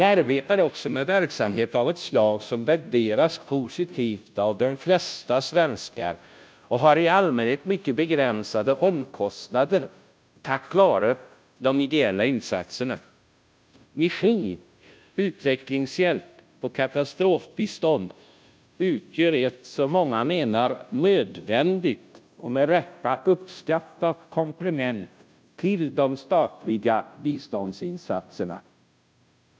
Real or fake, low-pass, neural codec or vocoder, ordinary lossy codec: fake; none; codec, 16 kHz, 0.5 kbps, FunCodec, trained on Chinese and English, 25 frames a second; none